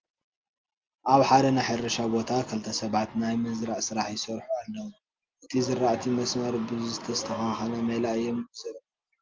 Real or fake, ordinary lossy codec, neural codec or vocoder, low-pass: real; Opus, 32 kbps; none; 7.2 kHz